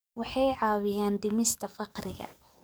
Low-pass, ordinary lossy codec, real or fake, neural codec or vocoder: none; none; fake; codec, 44.1 kHz, 7.8 kbps, DAC